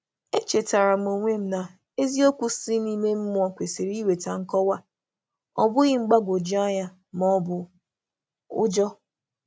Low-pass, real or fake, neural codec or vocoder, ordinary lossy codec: none; real; none; none